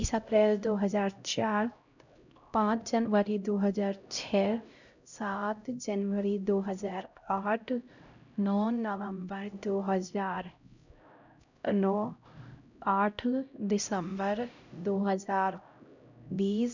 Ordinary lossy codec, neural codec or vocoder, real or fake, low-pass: none; codec, 16 kHz, 0.5 kbps, X-Codec, HuBERT features, trained on LibriSpeech; fake; 7.2 kHz